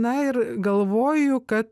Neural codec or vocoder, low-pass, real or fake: none; 14.4 kHz; real